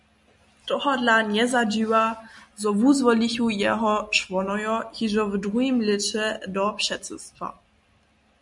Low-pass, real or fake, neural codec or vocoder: 10.8 kHz; real; none